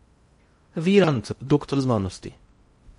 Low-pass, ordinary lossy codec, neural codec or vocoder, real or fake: 10.8 kHz; MP3, 48 kbps; codec, 16 kHz in and 24 kHz out, 0.6 kbps, FocalCodec, streaming, 2048 codes; fake